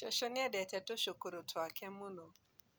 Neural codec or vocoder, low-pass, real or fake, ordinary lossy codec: none; none; real; none